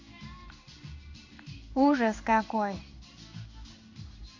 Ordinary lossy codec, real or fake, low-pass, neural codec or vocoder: MP3, 64 kbps; fake; 7.2 kHz; codec, 16 kHz in and 24 kHz out, 1 kbps, XY-Tokenizer